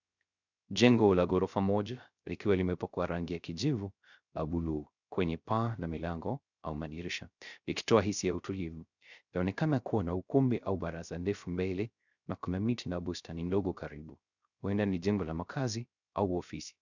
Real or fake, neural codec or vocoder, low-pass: fake; codec, 16 kHz, 0.3 kbps, FocalCodec; 7.2 kHz